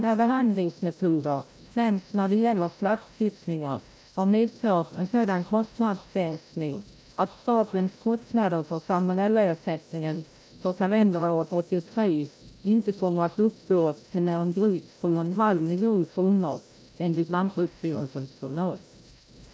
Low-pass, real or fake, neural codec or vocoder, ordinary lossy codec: none; fake; codec, 16 kHz, 0.5 kbps, FreqCodec, larger model; none